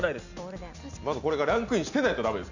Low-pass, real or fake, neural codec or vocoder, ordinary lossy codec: 7.2 kHz; real; none; none